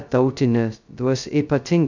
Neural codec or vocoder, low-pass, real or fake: codec, 16 kHz, 0.2 kbps, FocalCodec; 7.2 kHz; fake